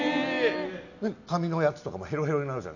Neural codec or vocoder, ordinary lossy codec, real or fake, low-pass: none; none; real; 7.2 kHz